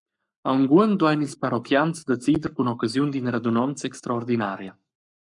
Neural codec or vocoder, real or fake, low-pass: codec, 44.1 kHz, 7.8 kbps, Pupu-Codec; fake; 10.8 kHz